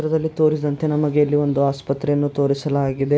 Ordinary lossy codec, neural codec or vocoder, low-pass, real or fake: none; none; none; real